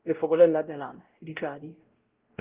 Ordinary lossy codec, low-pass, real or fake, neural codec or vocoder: Opus, 16 kbps; 3.6 kHz; fake; codec, 16 kHz, 0.5 kbps, X-Codec, WavLM features, trained on Multilingual LibriSpeech